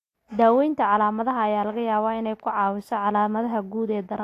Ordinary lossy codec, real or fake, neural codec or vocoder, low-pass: AAC, 64 kbps; real; none; 14.4 kHz